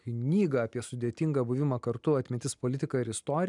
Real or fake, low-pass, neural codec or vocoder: real; 10.8 kHz; none